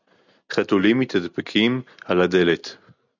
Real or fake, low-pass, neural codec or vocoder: real; 7.2 kHz; none